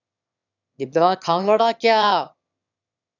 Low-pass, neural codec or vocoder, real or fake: 7.2 kHz; autoencoder, 22.05 kHz, a latent of 192 numbers a frame, VITS, trained on one speaker; fake